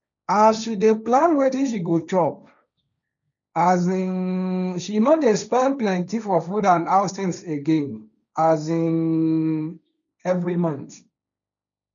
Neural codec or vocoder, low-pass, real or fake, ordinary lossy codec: codec, 16 kHz, 1.1 kbps, Voila-Tokenizer; 7.2 kHz; fake; none